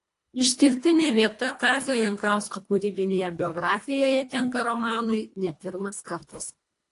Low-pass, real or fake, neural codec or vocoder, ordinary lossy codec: 10.8 kHz; fake; codec, 24 kHz, 1.5 kbps, HILCodec; AAC, 48 kbps